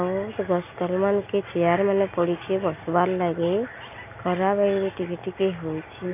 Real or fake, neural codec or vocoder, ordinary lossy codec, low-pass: real; none; none; 3.6 kHz